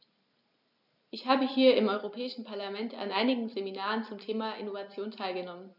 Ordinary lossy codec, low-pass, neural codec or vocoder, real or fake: none; 5.4 kHz; none; real